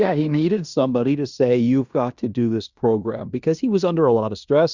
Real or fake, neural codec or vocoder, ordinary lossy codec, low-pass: fake; codec, 16 kHz in and 24 kHz out, 0.9 kbps, LongCat-Audio-Codec, fine tuned four codebook decoder; Opus, 64 kbps; 7.2 kHz